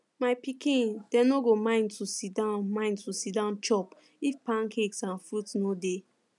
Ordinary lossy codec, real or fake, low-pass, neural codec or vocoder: none; real; 10.8 kHz; none